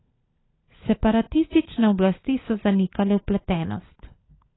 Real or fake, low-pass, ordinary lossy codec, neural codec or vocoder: fake; 7.2 kHz; AAC, 16 kbps; codec, 16 kHz, 16 kbps, FunCodec, trained on LibriTTS, 50 frames a second